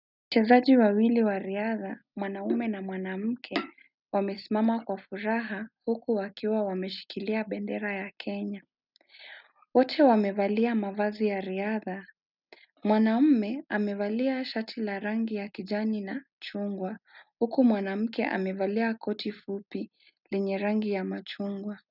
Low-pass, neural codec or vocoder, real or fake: 5.4 kHz; none; real